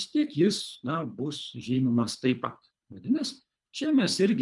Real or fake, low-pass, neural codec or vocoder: fake; 10.8 kHz; codec, 24 kHz, 3 kbps, HILCodec